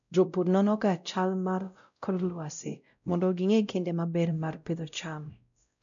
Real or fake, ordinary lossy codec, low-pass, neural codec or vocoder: fake; none; 7.2 kHz; codec, 16 kHz, 0.5 kbps, X-Codec, WavLM features, trained on Multilingual LibriSpeech